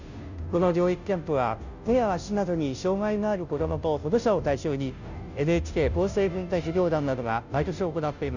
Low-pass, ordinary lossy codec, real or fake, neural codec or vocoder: 7.2 kHz; none; fake; codec, 16 kHz, 0.5 kbps, FunCodec, trained on Chinese and English, 25 frames a second